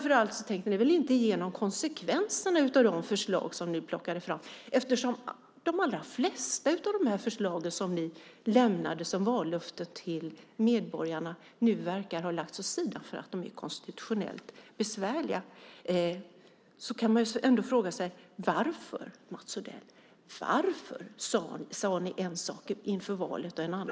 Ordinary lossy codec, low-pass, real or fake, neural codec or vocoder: none; none; real; none